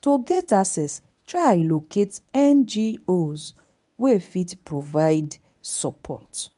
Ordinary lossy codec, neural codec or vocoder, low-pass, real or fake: MP3, 96 kbps; codec, 24 kHz, 0.9 kbps, WavTokenizer, medium speech release version 1; 10.8 kHz; fake